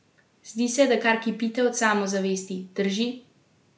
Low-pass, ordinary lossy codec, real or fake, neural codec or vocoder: none; none; real; none